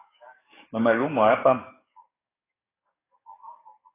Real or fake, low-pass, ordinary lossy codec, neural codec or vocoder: fake; 3.6 kHz; AAC, 16 kbps; codec, 44.1 kHz, 7.8 kbps, DAC